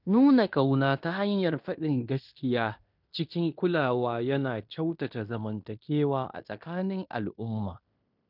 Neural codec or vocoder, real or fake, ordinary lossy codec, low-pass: codec, 16 kHz in and 24 kHz out, 0.9 kbps, LongCat-Audio-Codec, fine tuned four codebook decoder; fake; none; 5.4 kHz